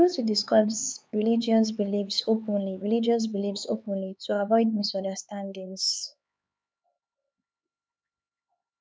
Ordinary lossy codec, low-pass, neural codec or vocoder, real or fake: none; none; codec, 16 kHz, 4 kbps, X-Codec, HuBERT features, trained on LibriSpeech; fake